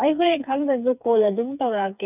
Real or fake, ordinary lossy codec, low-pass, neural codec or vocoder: fake; none; 3.6 kHz; codec, 16 kHz, 4 kbps, FreqCodec, smaller model